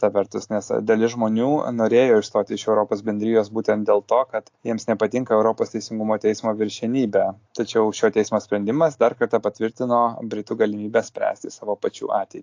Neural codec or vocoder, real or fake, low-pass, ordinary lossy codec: none; real; 7.2 kHz; AAC, 48 kbps